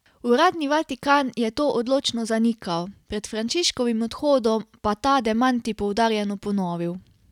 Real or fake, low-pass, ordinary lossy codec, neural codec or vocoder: real; 19.8 kHz; none; none